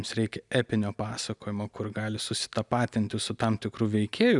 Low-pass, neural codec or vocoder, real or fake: 10.8 kHz; none; real